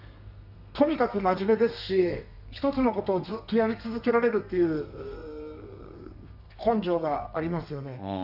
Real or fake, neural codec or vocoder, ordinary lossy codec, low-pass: fake; codec, 32 kHz, 1.9 kbps, SNAC; none; 5.4 kHz